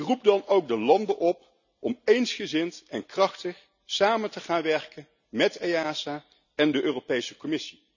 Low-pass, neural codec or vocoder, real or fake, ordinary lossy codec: 7.2 kHz; none; real; none